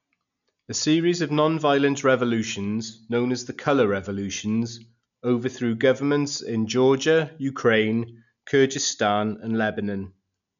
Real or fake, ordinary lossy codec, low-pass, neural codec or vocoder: real; none; 7.2 kHz; none